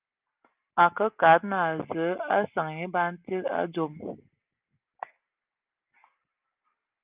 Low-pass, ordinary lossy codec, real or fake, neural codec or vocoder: 3.6 kHz; Opus, 24 kbps; real; none